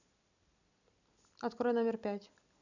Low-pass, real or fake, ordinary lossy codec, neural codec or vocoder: 7.2 kHz; real; none; none